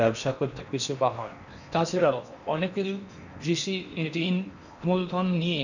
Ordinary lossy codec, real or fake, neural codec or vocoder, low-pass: none; fake; codec, 16 kHz in and 24 kHz out, 0.8 kbps, FocalCodec, streaming, 65536 codes; 7.2 kHz